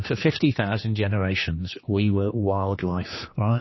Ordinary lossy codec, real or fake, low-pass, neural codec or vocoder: MP3, 24 kbps; fake; 7.2 kHz; codec, 16 kHz, 2 kbps, X-Codec, HuBERT features, trained on general audio